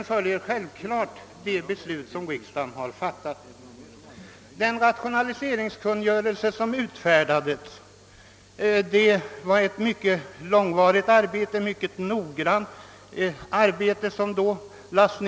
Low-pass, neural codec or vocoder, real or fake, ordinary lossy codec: none; none; real; none